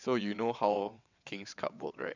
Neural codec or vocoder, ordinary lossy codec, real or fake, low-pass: vocoder, 22.05 kHz, 80 mel bands, WaveNeXt; none; fake; 7.2 kHz